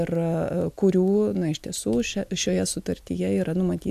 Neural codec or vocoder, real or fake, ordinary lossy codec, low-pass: none; real; AAC, 96 kbps; 14.4 kHz